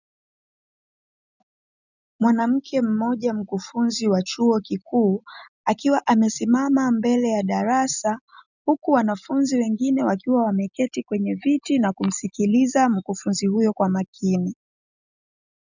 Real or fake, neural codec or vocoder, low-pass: real; none; 7.2 kHz